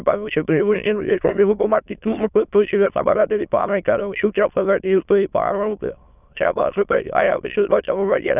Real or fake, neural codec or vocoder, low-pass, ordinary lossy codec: fake; autoencoder, 22.05 kHz, a latent of 192 numbers a frame, VITS, trained on many speakers; 3.6 kHz; none